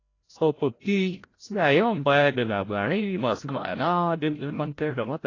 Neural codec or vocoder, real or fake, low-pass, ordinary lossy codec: codec, 16 kHz, 0.5 kbps, FreqCodec, larger model; fake; 7.2 kHz; AAC, 32 kbps